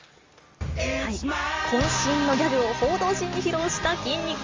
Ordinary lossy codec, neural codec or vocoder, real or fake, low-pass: Opus, 32 kbps; none; real; 7.2 kHz